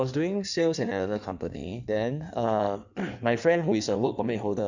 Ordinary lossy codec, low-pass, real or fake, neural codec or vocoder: none; 7.2 kHz; fake; codec, 16 kHz in and 24 kHz out, 1.1 kbps, FireRedTTS-2 codec